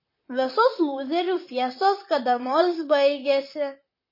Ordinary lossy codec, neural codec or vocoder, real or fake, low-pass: MP3, 24 kbps; vocoder, 44.1 kHz, 128 mel bands, Pupu-Vocoder; fake; 5.4 kHz